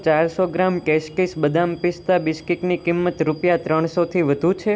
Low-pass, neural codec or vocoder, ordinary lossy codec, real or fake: none; none; none; real